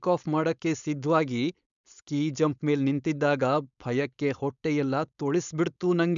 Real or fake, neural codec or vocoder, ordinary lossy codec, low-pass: fake; codec, 16 kHz, 4.8 kbps, FACodec; MP3, 96 kbps; 7.2 kHz